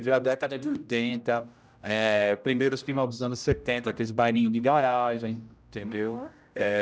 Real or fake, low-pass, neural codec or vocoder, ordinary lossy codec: fake; none; codec, 16 kHz, 0.5 kbps, X-Codec, HuBERT features, trained on general audio; none